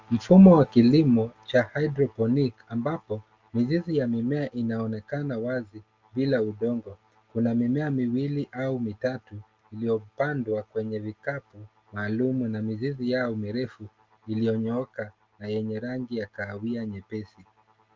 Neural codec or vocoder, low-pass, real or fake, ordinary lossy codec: none; 7.2 kHz; real; Opus, 32 kbps